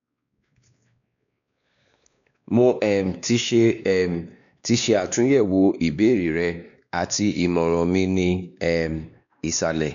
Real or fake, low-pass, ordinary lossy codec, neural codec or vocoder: fake; 7.2 kHz; none; codec, 16 kHz, 2 kbps, X-Codec, WavLM features, trained on Multilingual LibriSpeech